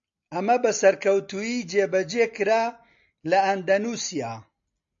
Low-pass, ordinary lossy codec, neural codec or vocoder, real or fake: 7.2 kHz; AAC, 64 kbps; none; real